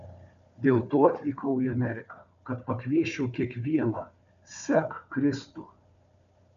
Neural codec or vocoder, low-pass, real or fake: codec, 16 kHz, 4 kbps, FunCodec, trained on Chinese and English, 50 frames a second; 7.2 kHz; fake